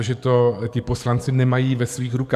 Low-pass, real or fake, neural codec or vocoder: 14.4 kHz; fake; codec, 44.1 kHz, 7.8 kbps, DAC